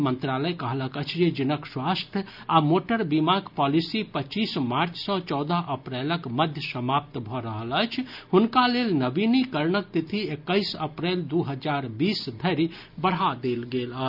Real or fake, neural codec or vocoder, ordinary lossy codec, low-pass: real; none; none; 5.4 kHz